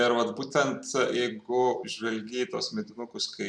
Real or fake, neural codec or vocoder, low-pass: real; none; 9.9 kHz